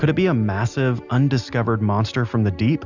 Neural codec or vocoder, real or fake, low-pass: none; real; 7.2 kHz